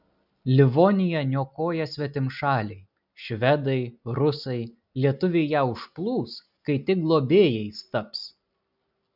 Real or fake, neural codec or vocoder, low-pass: real; none; 5.4 kHz